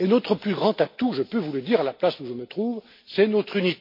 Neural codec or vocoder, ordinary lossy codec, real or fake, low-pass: none; MP3, 32 kbps; real; 5.4 kHz